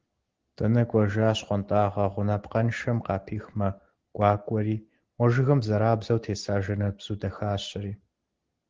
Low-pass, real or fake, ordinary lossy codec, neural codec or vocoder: 7.2 kHz; real; Opus, 16 kbps; none